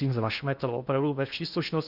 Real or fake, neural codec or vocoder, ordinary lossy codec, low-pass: fake; codec, 16 kHz in and 24 kHz out, 0.8 kbps, FocalCodec, streaming, 65536 codes; Opus, 64 kbps; 5.4 kHz